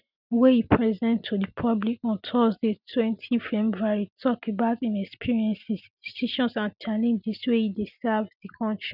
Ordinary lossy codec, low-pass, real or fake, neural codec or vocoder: none; 5.4 kHz; real; none